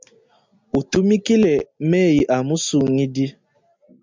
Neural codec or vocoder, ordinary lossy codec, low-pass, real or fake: none; MP3, 64 kbps; 7.2 kHz; real